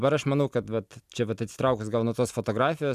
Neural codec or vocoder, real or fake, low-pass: none; real; 14.4 kHz